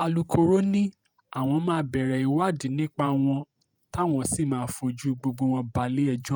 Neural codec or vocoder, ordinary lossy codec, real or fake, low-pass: vocoder, 48 kHz, 128 mel bands, Vocos; none; fake; none